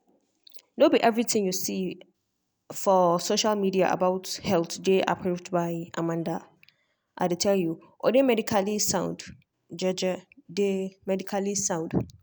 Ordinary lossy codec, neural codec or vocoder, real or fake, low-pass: none; none; real; none